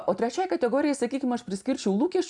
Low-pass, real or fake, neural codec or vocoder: 10.8 kHz; real; none